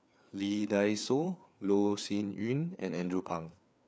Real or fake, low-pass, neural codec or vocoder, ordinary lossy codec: fake; none; codec, 16 kHz, 4 kbps, FreqCodec, larger model; none